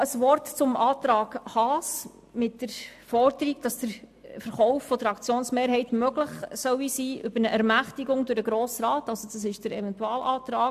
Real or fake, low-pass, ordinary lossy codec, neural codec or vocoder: real; 14.4 kHz; AAC, 96 kbps; none